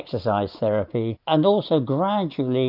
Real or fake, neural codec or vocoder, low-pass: real; none; 5.4 kHz